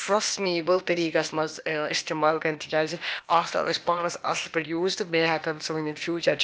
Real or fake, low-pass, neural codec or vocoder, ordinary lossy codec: fake; none; codec, 16 kHz, 0.8 kbps, ZipCodec; none